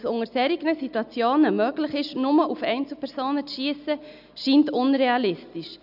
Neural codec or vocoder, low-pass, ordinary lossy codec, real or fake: none; 5.4 kHz; none; real